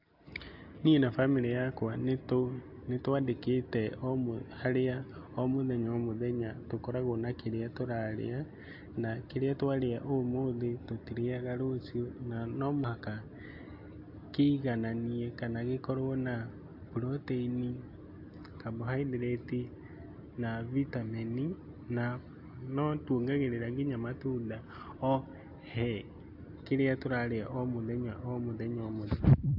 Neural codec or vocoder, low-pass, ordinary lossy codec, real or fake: none; 5.4 kHz; AAC, 48 kbps; real